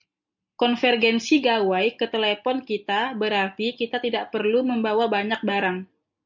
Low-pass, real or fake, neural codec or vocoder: 7.2 kHz; real; none